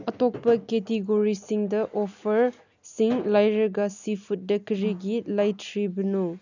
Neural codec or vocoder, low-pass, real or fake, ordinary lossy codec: none; 7.2 kHz; real; none